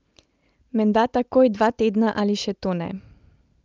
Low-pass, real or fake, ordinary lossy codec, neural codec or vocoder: 7.2 kHz; real; Opus, 24 kbps; none